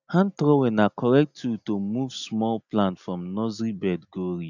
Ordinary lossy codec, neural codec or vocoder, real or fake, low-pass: none; none; real; 7.2 kHz